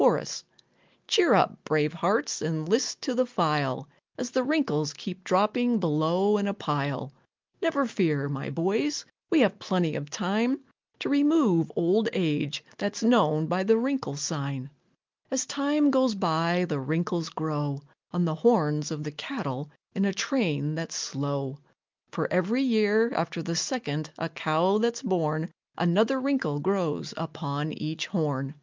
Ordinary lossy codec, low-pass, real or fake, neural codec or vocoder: Opus, 32 kbps; 7.2 kHz; real; none